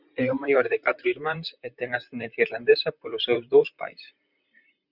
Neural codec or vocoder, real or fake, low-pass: vocoder, 44.1 kHz, 128 mel bands, Pupu-Vocoder; fake; 5.4 kHz